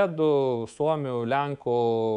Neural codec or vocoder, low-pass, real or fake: codec, 24 kHz, 3.1 kbps, DualCodec; 10.8 kHz; fake